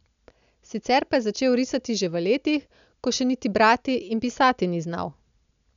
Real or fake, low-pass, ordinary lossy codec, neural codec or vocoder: real; 7.2 kHz; none; none